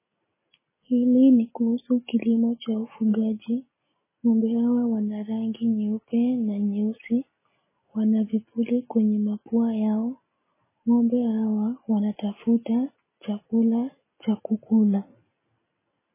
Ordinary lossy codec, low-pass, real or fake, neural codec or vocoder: MP3, 16 kbps; 3.6 kHz; real; none